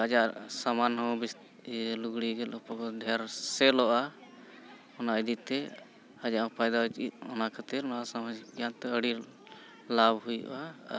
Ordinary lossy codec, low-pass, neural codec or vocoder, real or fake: none; none; none; real